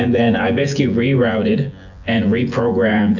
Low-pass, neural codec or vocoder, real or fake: 7.2 kHz; vocoder, 24 kHz, 100 mel bands, Vocos; fake